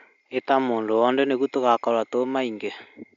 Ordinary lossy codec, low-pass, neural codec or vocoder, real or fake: none; 7.2 kHz; none; real